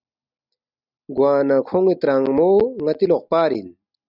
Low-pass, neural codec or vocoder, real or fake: 5.4 kHz; none; real